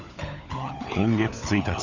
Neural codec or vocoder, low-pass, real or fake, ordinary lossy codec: codec, 16 kHz, 16 kbps, FunCodec, trained on LibriTTS, 50 frames a second; 7.2 kHz; fake; none